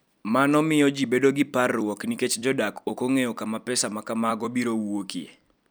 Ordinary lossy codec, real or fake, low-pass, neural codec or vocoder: none; fake; none; vocoder, 44.1 kHz, 128 mel bands every 256 samples, BigVGAN v2